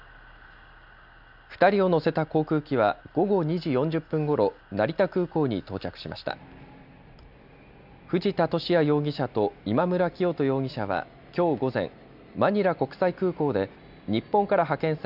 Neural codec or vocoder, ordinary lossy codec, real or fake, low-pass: none; none; real; 5.4 kHz